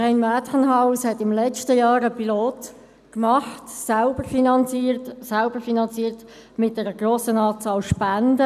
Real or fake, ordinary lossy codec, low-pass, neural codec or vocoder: real; none; 14.4 kHz; none